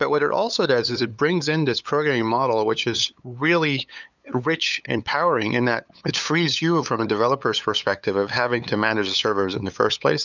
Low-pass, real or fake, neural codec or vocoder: 7.2 kHz; fake; codec, 16 kHz, 8 kbps, FunCodec, trained on LibriTTS, 25 frames a second